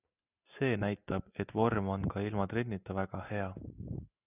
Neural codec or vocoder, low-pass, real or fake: none; 3.6 kHz; real